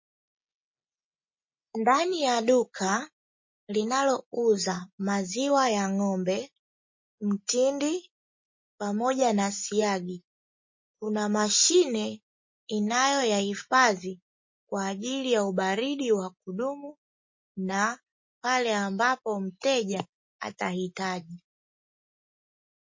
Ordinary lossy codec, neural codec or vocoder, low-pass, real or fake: MP3, 32 kbps; none; 7.2 kHz; real